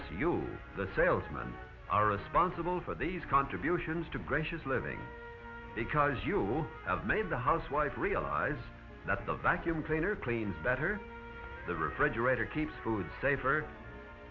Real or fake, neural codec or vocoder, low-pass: real; none; 7.2 kHz